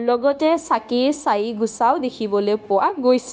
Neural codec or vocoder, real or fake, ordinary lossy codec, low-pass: codec, 16 kHz, 0.9 kbps, LongCat-Audio-Codec; fake; none; none